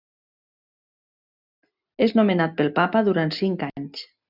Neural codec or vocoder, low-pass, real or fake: none; 5.4 kHz; real